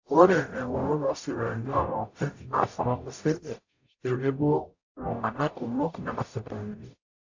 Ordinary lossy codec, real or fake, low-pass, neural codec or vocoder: AAC, 48 kbps; fake; 7.2 kHz; codec, 44.1 kHz, 0.9 kbps, DAC